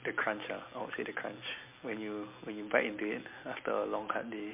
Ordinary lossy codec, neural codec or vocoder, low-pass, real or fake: MP3, 32 kbps; none; 3.6 kHz; real